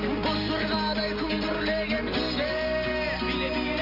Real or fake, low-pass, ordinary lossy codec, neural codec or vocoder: real; 5.4 kHz; none; none